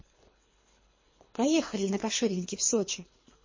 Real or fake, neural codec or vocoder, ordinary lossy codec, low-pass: fake; codec, 24 kHz, 3 kbps, HILCodec; MP3, 32 kbps; 7.2 kHz